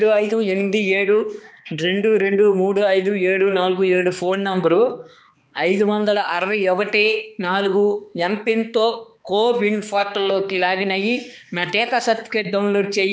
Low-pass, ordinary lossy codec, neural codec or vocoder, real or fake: none; none; codec, 16 kHz, 2 kbps, X-Codec, HuBERT features, trained on balanced general audio; fake